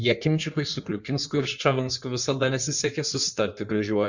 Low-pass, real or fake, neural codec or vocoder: 7.2 kHz; fake; codec, 16 kHz in and 24 kHz out, 1.1 kbps, FireRedTTS-2 codec